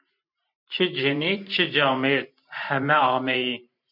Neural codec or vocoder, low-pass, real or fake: vocoder, 44.1 kHz, 128 mel bands every 512 samples, BigVGAN v2; 5.4 kHz; fake